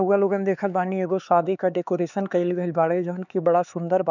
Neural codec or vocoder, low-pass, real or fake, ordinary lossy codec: codec, 16 kHz, 4 kbps, X-Codec, HuBERT features, trained on LibriSpeech; 7.2 kHz; fake; none